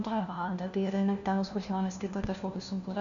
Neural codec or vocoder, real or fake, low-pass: codec, 16 kHz, 1 kbps, FunCodec, trained on LibriTTS, 50 frames a second; fake; 7.2 kHz